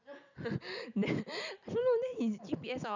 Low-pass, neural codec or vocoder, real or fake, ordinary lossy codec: 7.2 kHz; none; real; none